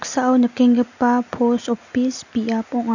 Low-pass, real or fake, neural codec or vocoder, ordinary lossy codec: 7.2 kHz; real; none; none